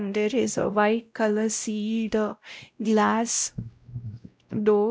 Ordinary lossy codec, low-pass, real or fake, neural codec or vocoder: none; none; fake; codec, 16 kHz, 0.5 kbps, X-Codec, WavLM features, trained on Multilingual LibriSpeech